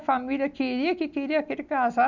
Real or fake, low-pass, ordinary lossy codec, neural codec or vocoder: real; 7.2 kHz; none; none